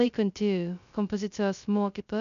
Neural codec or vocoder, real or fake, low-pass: codec, 16 kHz, 0.2 kbps, FocalCodec; fake; 7.2 kHz